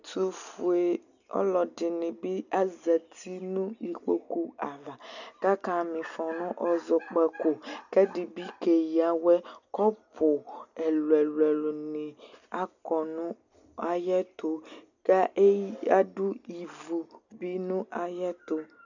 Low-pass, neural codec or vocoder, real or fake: 7.2 kHz; none; real